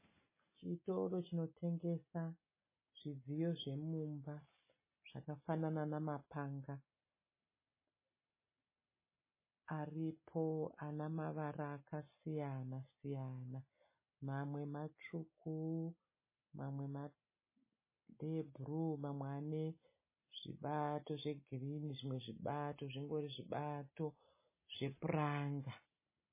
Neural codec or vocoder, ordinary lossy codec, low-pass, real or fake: none; MP3, 16 kbps; 3.6 kHz; real